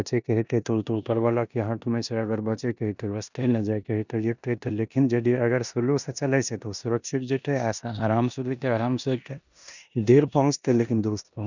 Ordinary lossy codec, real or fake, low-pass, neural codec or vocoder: none; fake; 7.2 kHz; codec, 16 kHz in and 24 kHz out, 0.9 kbps, LongCat-Audio-Codec, four codebook decoder